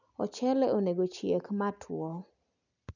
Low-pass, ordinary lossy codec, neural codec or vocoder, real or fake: 7.2 kHz; none; none; real